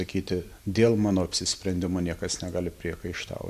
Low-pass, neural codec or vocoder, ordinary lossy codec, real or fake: 14.4 kHz; none; AAC, 96 kbps; real